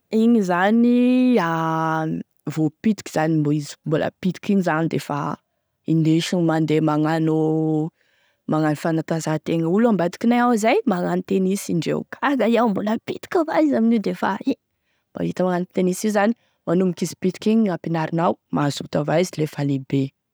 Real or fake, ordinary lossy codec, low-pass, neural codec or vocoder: real; none; none; none